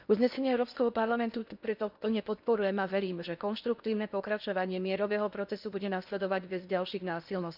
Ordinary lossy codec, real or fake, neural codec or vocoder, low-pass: none; fake; codec, 16 kHz in and 24 kHz out, 0.8 kbps, FocalCodec, streaming, 65536 codes; 5.4 kHz